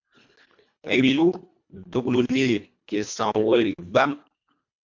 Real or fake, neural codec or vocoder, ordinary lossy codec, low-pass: fake; codec, 24 kHz, 1.5 kbps, HILCodec; MP3, 64 kbps; 7.2 kHz